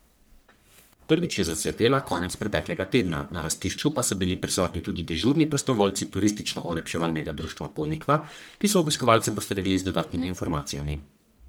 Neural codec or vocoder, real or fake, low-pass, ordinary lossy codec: codec, 44.1 kHz, 1.7 kbps, Pupu-Codec; fake; none; none